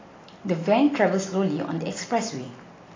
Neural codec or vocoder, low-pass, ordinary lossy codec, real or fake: none; 7.2 kHz; AAC, 32 kbps; real